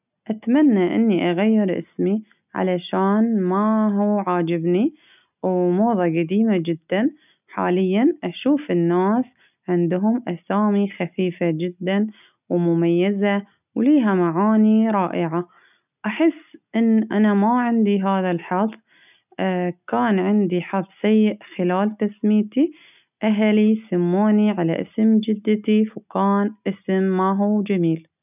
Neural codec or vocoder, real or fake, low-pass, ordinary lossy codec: none; real; 3.6 kHz; none